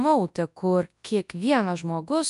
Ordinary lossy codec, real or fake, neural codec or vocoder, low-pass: MP3, 96 kbps; fake; codec, 24 kHz, 0.9 kbps, WavTokenizer, large speech release; 10.8 kHz